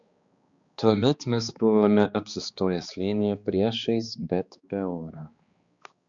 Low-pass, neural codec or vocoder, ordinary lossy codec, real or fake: 7.2 kHz; codec, 16 kHz, 2 kbps, X-Codec, HuBERT features, trained on balanced general audio; Opus, 64 kbps; fake